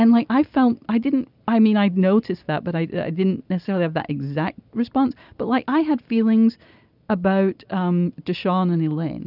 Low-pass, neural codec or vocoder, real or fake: 5.4 kHz; none; real